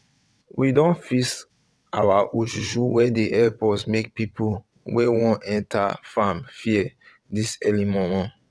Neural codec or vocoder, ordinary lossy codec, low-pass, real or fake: vocoder, 22.05 kHz, 80 mel bands, WaveNeXt; none; none; fake